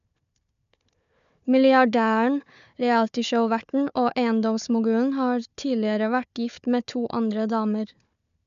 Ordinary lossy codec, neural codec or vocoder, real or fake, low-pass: MP3, 96 kbps; codec, 16 kHz, 4 kbps, FunCodec, trained on Chinese and English, 50 frames a second; fake; 7.2 kHz